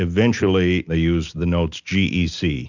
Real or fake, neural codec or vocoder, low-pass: real; none; 7.2 kHz